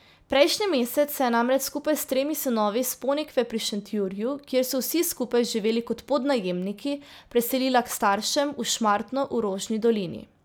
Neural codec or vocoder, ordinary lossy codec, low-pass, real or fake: none; none; none; real